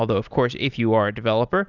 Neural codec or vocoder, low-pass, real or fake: none; 7.2 kHz; real